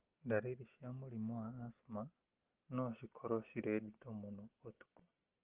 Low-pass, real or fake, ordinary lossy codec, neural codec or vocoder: 3.6 kHz; real; Opus, 16 kbps; none